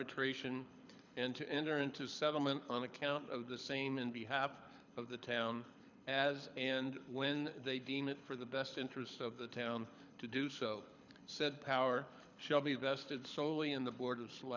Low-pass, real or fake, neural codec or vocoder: 7.2 kHz; fake; codec, 24 kHz, 6 kbps, HILCodec